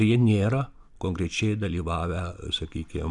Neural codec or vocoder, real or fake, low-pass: none; real; 10.8 kHz